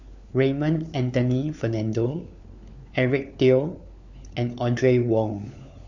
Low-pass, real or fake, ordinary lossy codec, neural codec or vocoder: 7.2 kHz; fake; none; codec, 16 kHz, 4 kbps, FunCodec, trained on LibriTTS, 50 frames a second